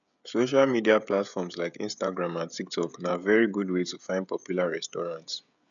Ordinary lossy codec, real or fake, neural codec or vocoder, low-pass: none; fake; codec, 16 kHz, 16 kbps, FreqCodec, smaller model; 7.2 kHz